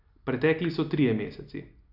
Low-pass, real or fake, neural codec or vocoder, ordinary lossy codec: 5.4 kHz; real; none; none